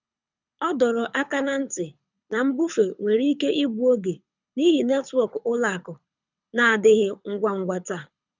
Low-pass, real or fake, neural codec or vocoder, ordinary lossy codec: 7.2 kHz; fake; codec, 24 kHz, 6 kbps, HILCodec; none